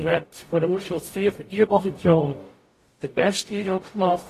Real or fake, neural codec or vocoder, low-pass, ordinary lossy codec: fake; codec, 44.1 kHz, 0.9 kbps, DAC; 14.4 kHz; AAC, 48 kbps